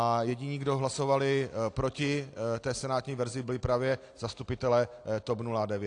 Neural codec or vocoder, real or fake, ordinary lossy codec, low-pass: none; real; AAC, 48 kbps; 9.9 kHz